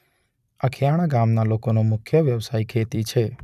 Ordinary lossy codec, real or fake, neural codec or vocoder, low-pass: none; fake; vocoder, 44.1 kHz, 128 mel bands every 256 samples, BigVGAN v2; 14.4 kHz